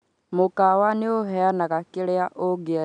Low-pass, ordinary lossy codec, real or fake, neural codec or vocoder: 9.9 kHz; none; real; none